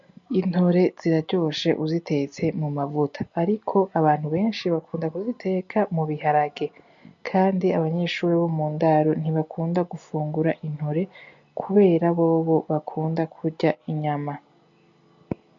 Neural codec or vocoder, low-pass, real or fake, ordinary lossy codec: none; 7.2 kHz; real; MP3, 64 kbps